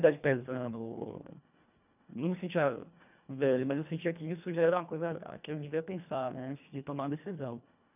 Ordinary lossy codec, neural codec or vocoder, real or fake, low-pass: none; codec, 24 kHz, 1.5 kbps, HILCodec; fake; 3.6 kHz